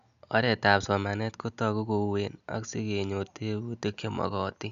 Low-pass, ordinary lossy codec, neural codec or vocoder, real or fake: 7.2 kHz; none; none; real